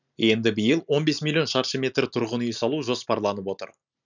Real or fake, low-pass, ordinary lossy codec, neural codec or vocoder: real; 7.2 kHz; none; none